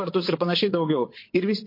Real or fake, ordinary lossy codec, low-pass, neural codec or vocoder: fake; MP3, 32 kbps; 5.4 kHz; vocoder, 22.05 kHz, 80 mel bands, Vocos